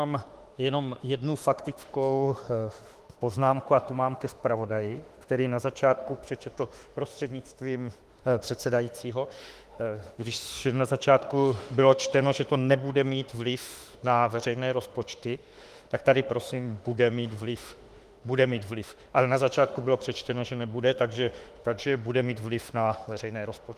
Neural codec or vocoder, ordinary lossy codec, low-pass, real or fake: autoencoder, 48 kHz, 32 numbers a frame, DAC-VAE, trained on Japanese speech; Opus, 24 kbps; 14.4 kHz; fake